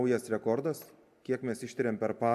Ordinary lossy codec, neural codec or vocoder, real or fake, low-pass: AAC, 96 kbps; none; real; 14.4 kHz